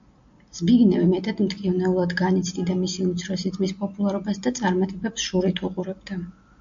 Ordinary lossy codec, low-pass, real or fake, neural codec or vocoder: AAC, 64 kbps; 7.2 kHz; real; none